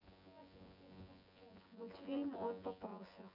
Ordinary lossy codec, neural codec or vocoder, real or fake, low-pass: none; vocoder, 24 kHz, 100 mel bands, Vocos; fake; 5.4 kHz